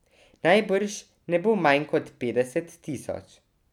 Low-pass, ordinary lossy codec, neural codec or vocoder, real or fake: 19.8 kHz; none; none; real